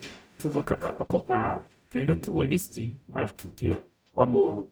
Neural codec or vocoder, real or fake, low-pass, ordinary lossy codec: codec, 44.1 kHz, 0.9 kbps, DAC; fake; none; none